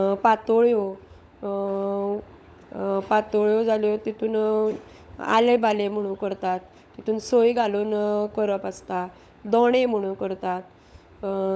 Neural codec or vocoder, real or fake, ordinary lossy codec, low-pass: codec, 16 kHz, 16 kbps, FunCodec, trained on LibriTTS, 50 frames a second; fake; none; none